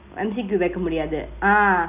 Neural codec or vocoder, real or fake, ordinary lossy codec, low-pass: none; real; none; 3.6 kHz